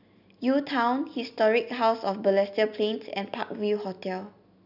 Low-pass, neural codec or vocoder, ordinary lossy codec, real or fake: 5.4 kHz; none; none; real